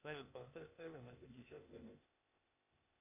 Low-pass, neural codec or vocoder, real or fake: 3.6 kHz; codec, 16 kHz, 0.8 kbps, ZipCodec; fake